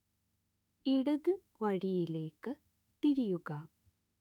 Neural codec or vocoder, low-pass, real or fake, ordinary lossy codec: autoencoder, 48 kHz, 32 numbers a frame, DAC-VAE, trained on Japanese speech; 19.8 kHz; fake; none